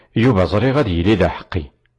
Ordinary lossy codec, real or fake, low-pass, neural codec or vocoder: AAC, 32 kbps; real; 10.8 kHz; none